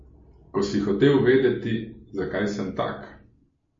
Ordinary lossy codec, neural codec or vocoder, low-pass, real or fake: MP3, 32 kbps; none; 7.2 kHz; real